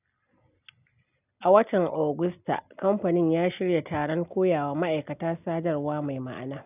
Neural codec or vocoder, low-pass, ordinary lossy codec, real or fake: none; 3.6 kHz; none; real